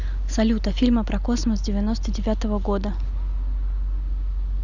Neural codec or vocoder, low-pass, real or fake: none; 7.2 kHz; real